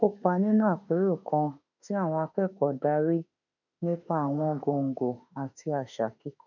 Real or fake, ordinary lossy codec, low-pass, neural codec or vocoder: fake; none; 7.2 kHz; autoencoder, 48 kHz, 32 numbers a frame, DAC-VAE, trained on Japanese speech